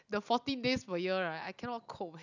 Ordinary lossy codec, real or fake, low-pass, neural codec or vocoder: none; real; 7.2 kHz; none